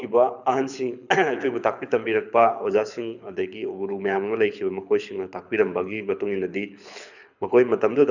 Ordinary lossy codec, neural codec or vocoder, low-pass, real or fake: none; codec, 24 kHz, 6 kbps, HILCodec; 7.2 kHz; fake